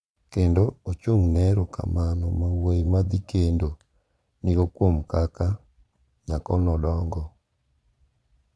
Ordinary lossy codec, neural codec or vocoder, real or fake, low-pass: none; vocoder, 22.05 kHz, 80 mel bands, Vocos; fake; none